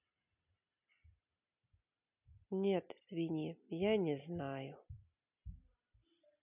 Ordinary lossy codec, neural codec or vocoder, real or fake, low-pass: none; none; real; 3.6 kHz